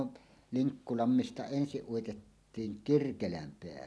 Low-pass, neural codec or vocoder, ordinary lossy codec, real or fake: none; none; none; real